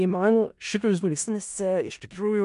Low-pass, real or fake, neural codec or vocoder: 10.8 kHz; fake; codec, 16 kHz in and 24 kHz out, 0.4 kbps, LongCat-Audio-Codec, four codebook decoder